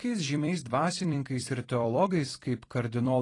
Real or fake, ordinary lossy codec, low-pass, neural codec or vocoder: fake; AAC, 32 kbps; 10.8 kHz; vocoder, 44.1 kHz, 128 mel bands every 256 samples, BigVGAN v2